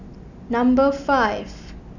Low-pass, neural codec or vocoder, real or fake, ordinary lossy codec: 7.2 kHz; none; real; none